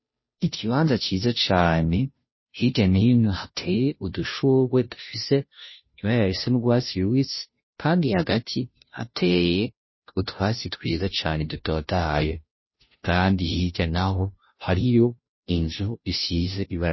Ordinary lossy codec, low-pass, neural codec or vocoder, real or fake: MP3, 24 kbps; 7.2 kHz; codec, 16 kHz, 0.5 kbps, FunCodec, trained on Chinese and English, 25 frames a second; fake